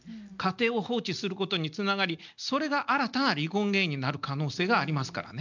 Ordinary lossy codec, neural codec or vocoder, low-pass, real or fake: none; none; 7.2 kHz; real